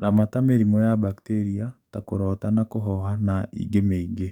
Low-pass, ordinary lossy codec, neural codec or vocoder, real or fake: 19.8 kHz; none; codec, 44.1 kHz, 7.8 kbps, DAC; fake